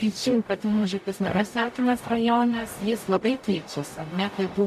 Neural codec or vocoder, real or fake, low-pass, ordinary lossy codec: codec, 44.1 kHz, 0.9 kbps, DAC; fake; 14.4 kHz; MP3, 96 kbps